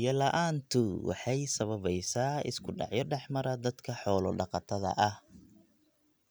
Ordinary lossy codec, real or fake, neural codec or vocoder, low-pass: none; real; none; none